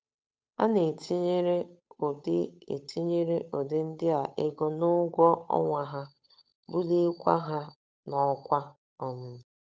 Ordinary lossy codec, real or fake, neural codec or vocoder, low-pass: none; fake; codec, 16 kHz, 8 kbps, FunCodec, trained on Chinese and English, 25 frames a second; none